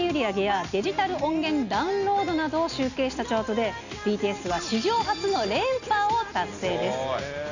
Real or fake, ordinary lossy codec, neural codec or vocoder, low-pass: real; none; none; 7.2 kHz